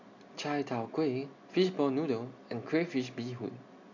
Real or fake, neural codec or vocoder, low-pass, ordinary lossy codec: real; none; 7.2 kHz; none